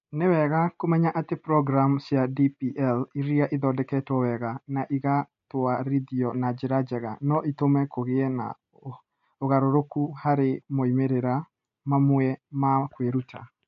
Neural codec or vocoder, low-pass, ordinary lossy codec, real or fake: none; 7.2 kHz; MP3, 48 kbps; real